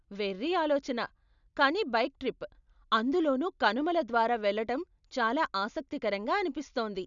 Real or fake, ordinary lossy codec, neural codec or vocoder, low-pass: real; none; none; 7.2 kHz